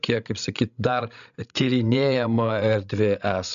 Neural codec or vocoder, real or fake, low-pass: codec, 16 kHz, 16 kbps, FreqCodec, larger model; fake; 7.2 kHz